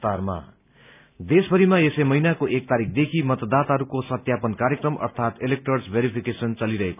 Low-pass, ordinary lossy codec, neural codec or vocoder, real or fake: 3.6 kHz; none; none; real